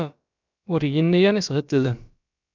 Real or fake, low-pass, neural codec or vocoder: fake; 7.2 kHz; codec, 16 kHz, about 1 kbps, DyCAST, with the encoder's durations